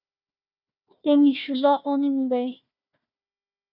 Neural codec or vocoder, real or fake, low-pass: codec, 16 kHz, 1 kbps, FunCodec, trained on Chinese and English, 50 frames a second; fake; 5.4 kHz